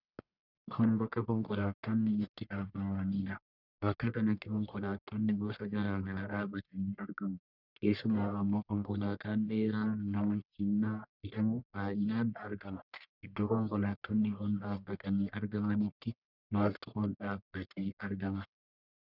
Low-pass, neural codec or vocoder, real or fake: 5.4 kHz; codec, 44.1 kHz, 1.7 kbps, Pupu-Codec; fake